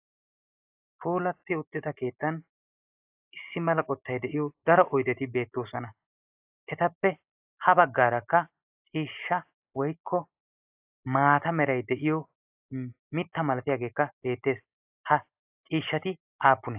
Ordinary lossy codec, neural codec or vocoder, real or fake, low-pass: AAC, 32 kbps; none; real; 3.6 kHz